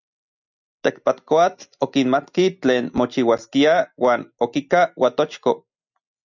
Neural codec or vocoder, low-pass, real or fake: none; 7.2 kHz; real